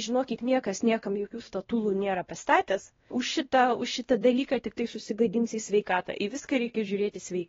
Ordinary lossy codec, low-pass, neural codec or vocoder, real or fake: AAC, 24 kbps; 7.2 kHz; codec, 16 kHz, 1 kbps, X-Codec, WavLM features, trained on Multilingual LibriSpeech; fake